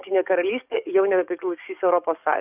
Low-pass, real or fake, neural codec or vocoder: 3.6 kHz; fake; codec, 44.1 kHz, 7.8 kbps, DAC